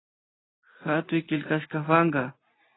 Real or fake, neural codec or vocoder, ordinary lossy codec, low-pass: real; none; AAC, 16 kbps; 7.2 kHz